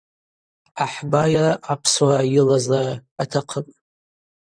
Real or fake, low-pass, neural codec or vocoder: fake; 9.9 kHz; vocoder, 44.1 kHz, 128 mel bands, Pupu-Vocoder